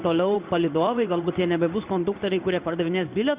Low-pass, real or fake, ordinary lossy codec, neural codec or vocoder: 3.6 kHz; fake; Opus, 64 kbps; codec, 16 kHz in and 24 kHz out, 1 kbps, XY-Tokenizer